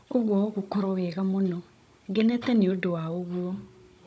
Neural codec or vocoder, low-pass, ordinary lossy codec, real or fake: codec, 16 kHz, 16 kbps, FunCodec, trained on Chinese and English, 50 frames a second; none; none; fake